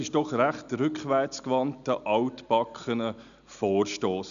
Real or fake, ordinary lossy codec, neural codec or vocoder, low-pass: real; none; none; 7.2 kHz